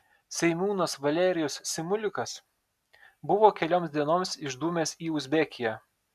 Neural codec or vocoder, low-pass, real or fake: none; 14.4 kHz; real